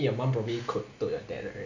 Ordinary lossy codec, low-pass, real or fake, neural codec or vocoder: none; 7.2 kHz; real; none